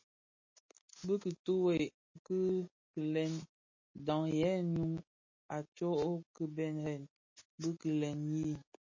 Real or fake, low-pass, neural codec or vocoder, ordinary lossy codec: real; 7.2 kHz; none; MP3, 32 kbps